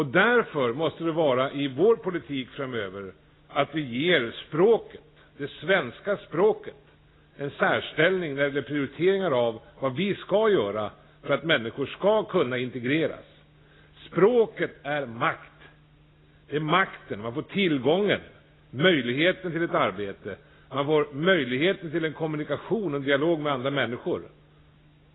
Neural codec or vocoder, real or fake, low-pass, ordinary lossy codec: none; real; 7.2 kHz; AAC, 16 kbps